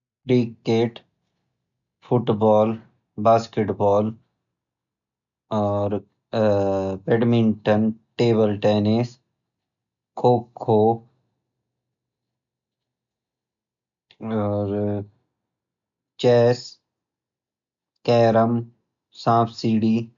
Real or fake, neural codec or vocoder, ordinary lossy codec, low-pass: real; none; none; 7.2 kHz